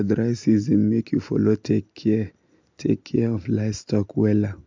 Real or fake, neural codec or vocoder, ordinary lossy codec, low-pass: real; none; MP3, 64 kbps; 7.2 kHz